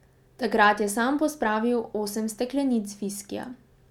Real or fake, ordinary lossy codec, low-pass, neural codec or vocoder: real; none; 19.8 kHz; none